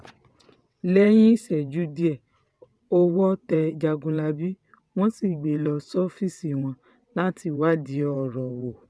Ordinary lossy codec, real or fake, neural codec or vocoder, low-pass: none; fake; vocoder, 22.05 kHz, 80 mel bands, WaveNeXt; none